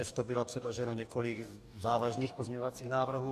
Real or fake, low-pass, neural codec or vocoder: fake; 14.4 kHz; codec, 44.1 kHz, 2.6 kbps, DAC